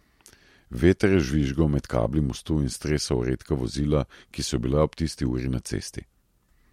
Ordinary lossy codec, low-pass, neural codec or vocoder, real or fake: MP3, 64 kbps; 19.8 kHz; none; real